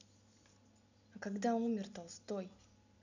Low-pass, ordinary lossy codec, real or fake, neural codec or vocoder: 7.2 kHz; none; real; none